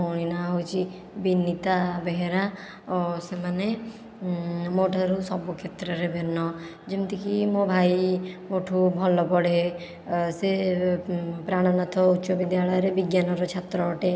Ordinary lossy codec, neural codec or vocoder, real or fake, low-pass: none; none; real; none